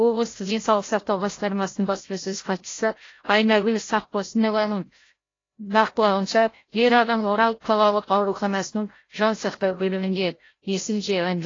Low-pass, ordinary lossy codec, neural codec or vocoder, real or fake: 7.2 kHz; AAC, 32 kbps; codec, 16 kHz, 0.5 kbps, FreqCodec, larger model; fake